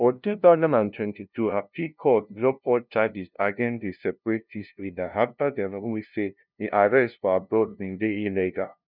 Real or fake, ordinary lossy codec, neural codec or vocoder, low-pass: fake; none; codec, 16 kHz, 0.5 kbps, FunCodec, trained on LibriTTS, 25 frames a second; 5.4 kHz